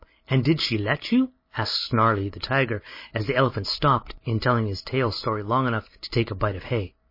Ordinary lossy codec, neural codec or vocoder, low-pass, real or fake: MP3, 24 kbps; none; 5.4 kHz; real